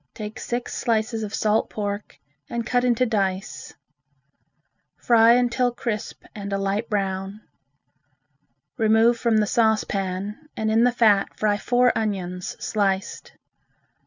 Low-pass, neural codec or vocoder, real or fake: 7.2 kHz; none; real